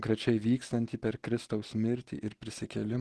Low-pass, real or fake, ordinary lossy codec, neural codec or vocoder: 10.8 kHz; real; Opus, 16 kbps; none